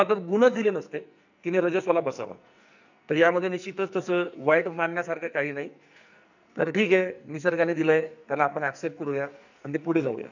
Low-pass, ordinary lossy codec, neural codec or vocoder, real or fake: 7.2 kHz; none; codec, 44.1 kHz, 2.6 kbps, SNAC; fake